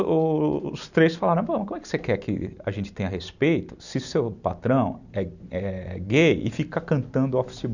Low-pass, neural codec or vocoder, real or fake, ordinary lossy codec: 7.2 kHz; none; real; none